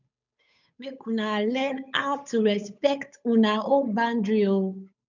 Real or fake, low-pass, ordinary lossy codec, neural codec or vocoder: fake; 7.2 kHz; none; codec, 16 kHz, 8 kbps, FunCodec, trained on Chinese and English, 25 frames a second